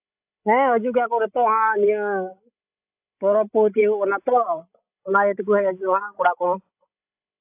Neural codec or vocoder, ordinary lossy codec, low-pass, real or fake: codec, 16 kHz, 16 kbps, FreqCodec, larger model; AAC, 32 kbps; 3.6 kHz; fake